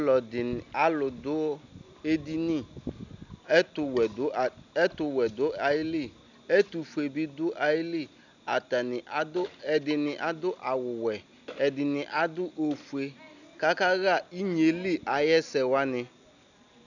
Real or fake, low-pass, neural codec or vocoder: real; 7.2 kHz; none